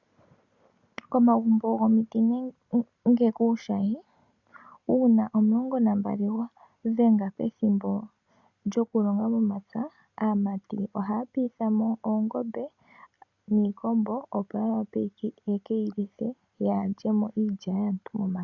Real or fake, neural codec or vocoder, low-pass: real; none; 7.2 kHz